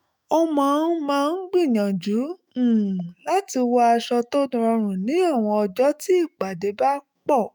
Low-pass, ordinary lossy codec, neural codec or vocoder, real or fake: none; none; autoencoder, 48 kHz, 128 numbers a frame, DAC-VAE, trained on Japanese speech; fake